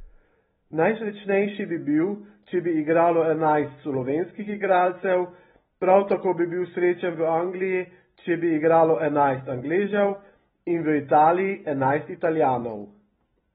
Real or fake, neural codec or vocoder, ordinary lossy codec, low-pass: real; none; AAC, 16 kbps; 7.2 kHz